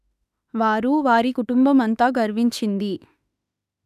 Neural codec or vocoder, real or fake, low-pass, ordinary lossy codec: autoencoder, 48 kHz, 32 numbers a frame, DAC-VAE, trained on Japanese speech; fake; 14.4 kHz; none